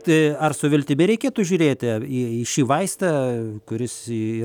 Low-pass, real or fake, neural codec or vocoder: 19.8 kHz; real; none